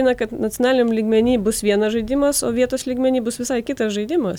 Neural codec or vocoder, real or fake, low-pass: none; real; 19.8 kHz